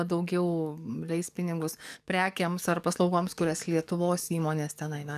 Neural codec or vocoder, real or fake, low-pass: codec, 44.1 kHz, 7.8 kbps, DAC; fake; 14.4 kHz